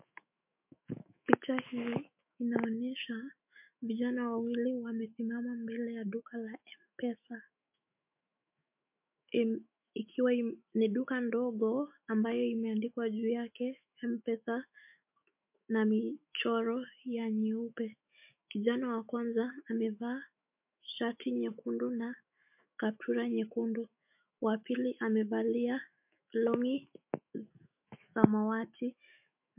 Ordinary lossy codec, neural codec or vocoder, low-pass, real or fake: MP3, 32 kbps; autoencoder, 48 kHz, 128 numbers a frame, DAC-VAE, trained on Japanese speech; 3.6 kHz; fake